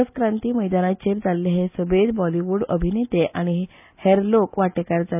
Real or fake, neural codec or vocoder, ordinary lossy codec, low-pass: real; none; none; 3.6 kHz